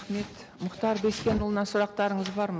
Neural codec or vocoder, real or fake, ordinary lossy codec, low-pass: none; real; none; none